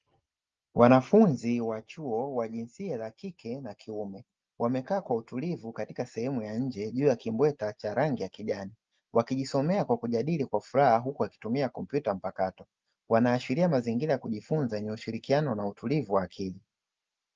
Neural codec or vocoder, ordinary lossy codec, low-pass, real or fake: none; Opus, 24 kbps; 7.2 kHz; real